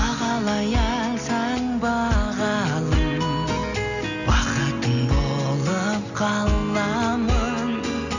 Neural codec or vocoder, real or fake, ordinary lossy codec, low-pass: none; real; none; 7.2 kHz